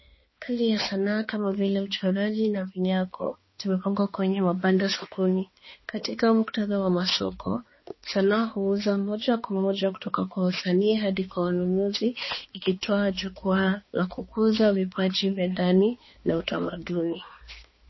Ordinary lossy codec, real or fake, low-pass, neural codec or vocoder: MP3, 24 kbps; fake; 7.2 kHz; codec, 16 kHz, 2 kbps, X-Codec, HuBERT features, trained on balanced general audio